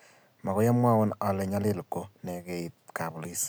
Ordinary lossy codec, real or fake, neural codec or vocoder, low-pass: none; real; none; none